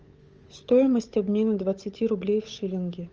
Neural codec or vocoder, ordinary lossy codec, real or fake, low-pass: codec, 16 kHz, 8 kbps, FunCodec, trained on Chinese and English, 25 frames a second; Opus, 24 kbps; fake; 7.2 kHz